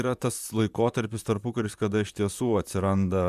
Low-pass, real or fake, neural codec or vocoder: 14.4 kHz; fake; vocoder, 44.1 kHz, 128 mel bands, Pupu-Vocoder